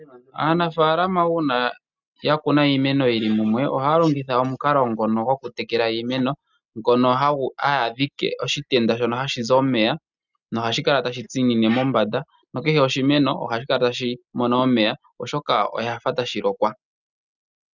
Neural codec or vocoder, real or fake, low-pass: none; real; 7.2 kHz